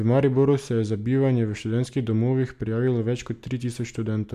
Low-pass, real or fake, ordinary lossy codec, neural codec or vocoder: 14.4 kHz; real; none; none